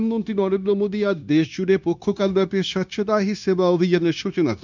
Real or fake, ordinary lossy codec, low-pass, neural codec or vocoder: fake; none; 7.2 kHz; codec, 16 kHz, 0.9 kbps, LongCat-Audio-Codec